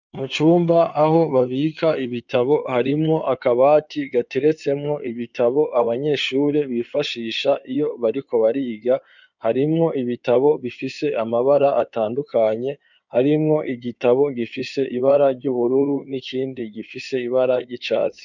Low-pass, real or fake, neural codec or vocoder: 7.2 kHz; fake; codec, 16 kHz in and 24 kHz out, 2.2 kbps, FireRedTTS-2 codec